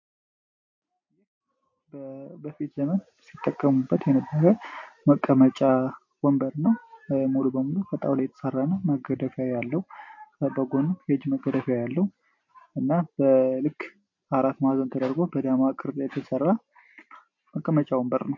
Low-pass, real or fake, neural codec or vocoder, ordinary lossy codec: 7.2 kHz; real; none; MP3, 48 kbps